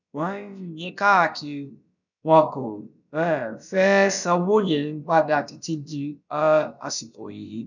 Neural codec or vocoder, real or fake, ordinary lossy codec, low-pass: codec, 16 kHz, about 1 kbps, DyCAST, with the encoder's durations; fake; none; 7.2 kHz